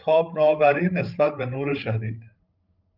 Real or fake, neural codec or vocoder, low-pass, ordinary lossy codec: fake; codec, 16 kHz, 16 kbps, FreqCodec, larger model; 5.4 kHz; Opus, 32 kbps